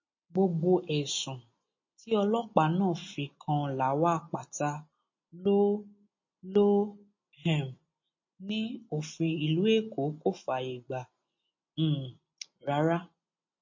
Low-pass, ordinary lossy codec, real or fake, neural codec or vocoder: 7.2 kHz; MP3, 32 kbps; real; none